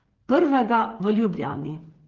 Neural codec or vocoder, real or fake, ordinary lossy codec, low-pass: codec, 16 kHz, 8 kbps, FreqCodec, smaller model; fake; Opus, 16 kbps; 7.2 kHz